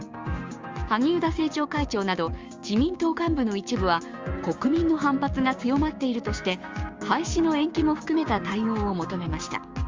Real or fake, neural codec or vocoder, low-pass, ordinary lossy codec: fake; codec, 16 kHz, 6 kbps, DAC; 7.2 kHz; Opus, 32 kbps